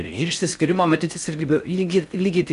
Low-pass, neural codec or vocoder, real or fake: 10.8 kHz; codec, 16 kHz in and 24 kHz out, 0.6 kbps, FocalCodec, streaming, 4096 codes; fake